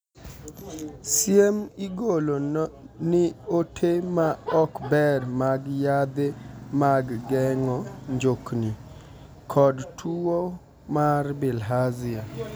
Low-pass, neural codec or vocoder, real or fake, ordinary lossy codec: none; none; real; none